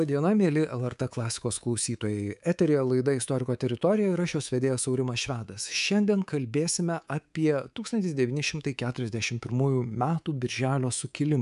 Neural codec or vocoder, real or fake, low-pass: codec, 24 kHz, 3.1 kbps, DualCodec; fake; 10.8 kHz